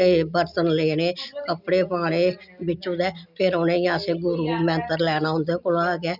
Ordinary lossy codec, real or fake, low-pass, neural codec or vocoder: none; real; 5.4 kHz; none